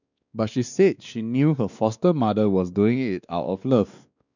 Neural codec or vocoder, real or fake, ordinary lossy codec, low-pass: codec, 16 kHz, 2 kbps, X-Codec, WavLM features, trained on Multilingual LibriSpeech; fake; none; 7.2 kHz